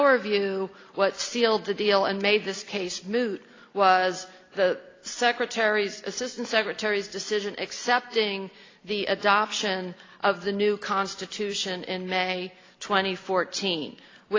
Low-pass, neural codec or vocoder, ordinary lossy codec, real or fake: 7.2 kHz; none; AAC, 32 kbps; real